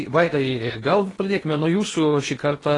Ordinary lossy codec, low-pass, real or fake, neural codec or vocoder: AAC, 32 kbps; 10.8 kHz; fake; codec, 16 kHz in and 24 kHz out, 0.8 kbps, FocalCodec, streaming, 65536 codes